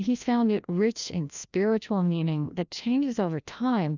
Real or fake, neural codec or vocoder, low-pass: fake; codec, 16 kHz, 1 kbps, FreqCodec, larger model; 7.2 kHz